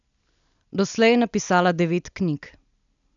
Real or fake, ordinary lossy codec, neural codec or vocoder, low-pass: real; none; none; 7.2 kHz